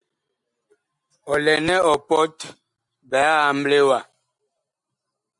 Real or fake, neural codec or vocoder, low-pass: real; none; 10.8 kHz